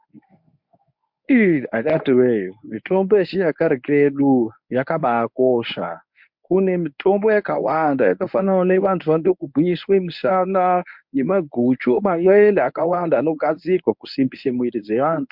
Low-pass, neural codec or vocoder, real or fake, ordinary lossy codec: 5.4 kHz; codec, 24 kHz, 0.9 kbps, WavTokenizer, medium speech release version 1; fake; MP3, 48 kbps